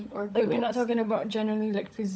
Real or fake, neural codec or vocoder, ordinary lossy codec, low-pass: fake; codec, 16 kHz, 16 kbps, FunCodec, trained on LibriTTS, 50 frames a second; none; none